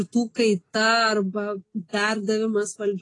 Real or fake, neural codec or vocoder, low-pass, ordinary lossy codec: real; none; 10.8 kHz; AAC, 32 kbps